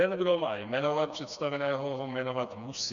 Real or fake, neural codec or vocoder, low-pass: fake; codec, 16 kHz, 2 kbps, FreqCodec, smaller model; 7.2 kHz